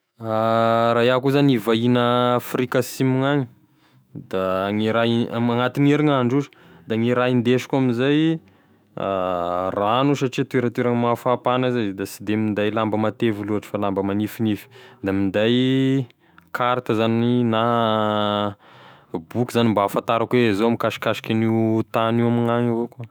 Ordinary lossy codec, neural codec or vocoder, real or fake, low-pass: none; autoencoder, 48 kHz, 128 numbers a frame, DAC-VAE, trained on Japanese speech; fake; none